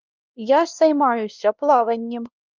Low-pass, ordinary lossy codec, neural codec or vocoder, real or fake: 7.2 kHz; Opus, 32 kbps; codec, 16 kHz, 1 kbps, X-Codec, WavLM features, trained on Multilingual LibriSpeech; fake